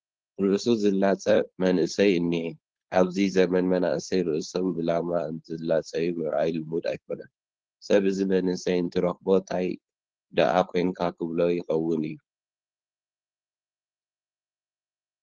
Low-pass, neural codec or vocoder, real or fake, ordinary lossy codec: 7.2 kHz; codec, 16 kHz, 4.8 kbps, FACodec; fake; Opus, 16 kbps